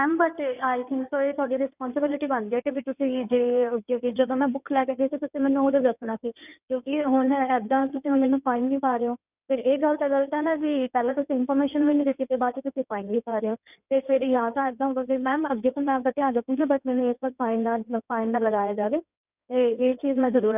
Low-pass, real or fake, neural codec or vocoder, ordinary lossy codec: 3.6 kHz; fake; codec, 16 kHz in and 24 kHz out, 2.2 kbps, FireRedTTS-2 codec; none